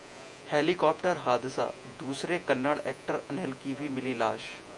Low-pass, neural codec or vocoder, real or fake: 10.8 kHz; vocoder, 48 kHz, 128 mel bands, Vocos; fake